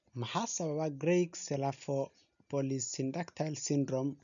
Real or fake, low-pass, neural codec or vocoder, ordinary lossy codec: real; 7.2 kHz; none; none